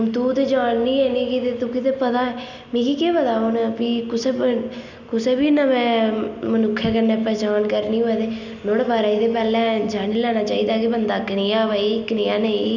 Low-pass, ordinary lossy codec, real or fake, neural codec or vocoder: 7.2 kHz; none; real; none